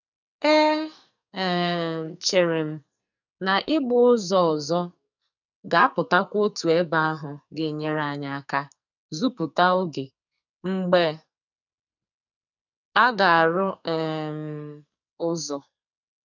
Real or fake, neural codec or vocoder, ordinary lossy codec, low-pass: fake; codec, 44.1 kHz, 2.6 kbps, SNAC; none; 7.2 kHz